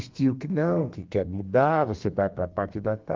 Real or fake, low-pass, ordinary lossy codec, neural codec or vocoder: fake; 7.2 kHz; Opus, 32 kbps; codec, 32 kHz, 1.9 kbps, SNAC